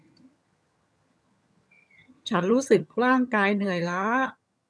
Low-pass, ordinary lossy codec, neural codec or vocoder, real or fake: none; none; vocoder, 22.05 kHz, 80 mel bands, HiFi-GAN; fake